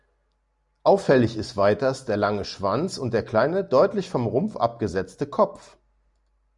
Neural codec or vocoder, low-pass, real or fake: none; 10.8 kHz; real